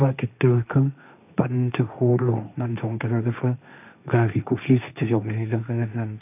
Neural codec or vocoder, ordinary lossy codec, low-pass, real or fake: codec, 16 kHz, 1.1 kbps, Voila-Tokenizer; none; 3.6 kHz; fake